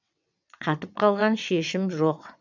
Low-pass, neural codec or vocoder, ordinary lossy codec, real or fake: 7.2 kHz; vocoder, 22.05 kHz, 80 mel bands, WaveNeXt; none; fake